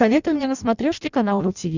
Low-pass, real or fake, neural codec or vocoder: 7.2 kHz; fake; codec, 16 kHz in and 24 kHz out, 0.6 kbps, FireRedTTS-2 codec